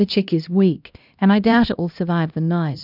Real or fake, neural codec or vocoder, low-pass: fake; codec, 16 kHz, 1 kbps, X-Codec, HuBERT features, trained on LibriSpeech; 5.4 kHz